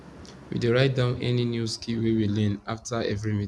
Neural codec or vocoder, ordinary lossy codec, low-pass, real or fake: none; none; none; real